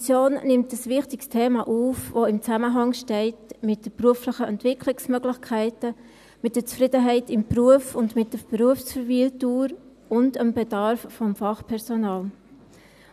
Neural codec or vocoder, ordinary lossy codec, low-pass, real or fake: none; none; 14.4 kHz; real